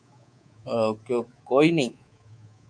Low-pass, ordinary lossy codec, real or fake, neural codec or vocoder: 9.9 kHz; MP3, 64 kbps; fake; codec, 24 kHz, 3.1 kbps, DualCodec